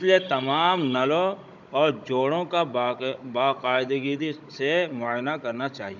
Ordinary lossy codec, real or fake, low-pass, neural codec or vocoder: none; fake; 7.2 kHz; codec, 16 kHz, 16 kbps, FunCodec, trained on Chinese and English, 50 frames a second